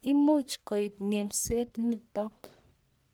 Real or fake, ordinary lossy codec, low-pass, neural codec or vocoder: fake; none; none; codec, 44.1 kHz, 1.7 kbps, Pupu-Codec